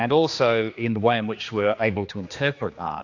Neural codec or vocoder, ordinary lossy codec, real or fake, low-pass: codec, 16 kHz, 2 kbps, X-Codec, HuBERT features, trained on general audio; AAC, 48 kbps; fake; 7.2 kHz